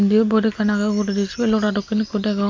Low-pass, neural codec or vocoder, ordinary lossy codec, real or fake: 7.2 kHz; none; MP3, 48 kbps; real